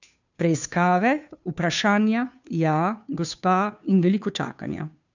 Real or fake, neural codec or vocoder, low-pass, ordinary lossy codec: fake; codec, 16 kHz, 2 kbps, FunCodec, trained on Chinese and English, 25 frames a second; 7.2 kHz; none